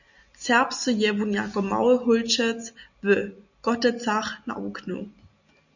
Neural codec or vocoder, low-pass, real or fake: none; 7.2 kHz; real